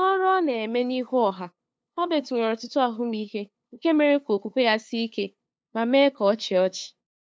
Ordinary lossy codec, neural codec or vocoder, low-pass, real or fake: none; codec, 16 kHz, 2 kbps, FunCodec, trained on LibriTTS, 25 frames a second; none; fake